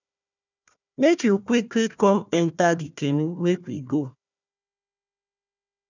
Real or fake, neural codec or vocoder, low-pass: fake; codec, 16 kHz, 1 kbps, FunCodec, trained on Chinese and English, 50 frames a second; 7.2 kHz